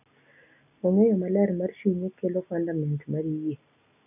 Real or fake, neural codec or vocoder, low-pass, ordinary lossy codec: real; none; 3.6 kHz; none